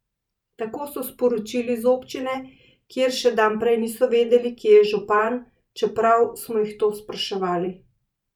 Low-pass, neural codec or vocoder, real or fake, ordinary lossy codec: 19.8 kHz; vocoder, 44.1 kHz, 128 mel bands every 512 samples, BigVGAN v2; fake; none